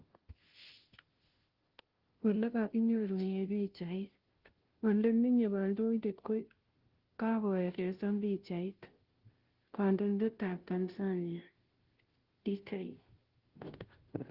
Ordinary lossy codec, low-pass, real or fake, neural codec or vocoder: Opus, 16 kbps; 5.4 kHz; fake; codec, 16 kHz, 0.5 kbps, FunCodec, trained on Chinese and English, 25 frames a second